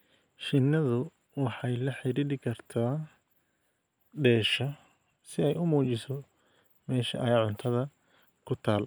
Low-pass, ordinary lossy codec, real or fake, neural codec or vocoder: none; none; real; none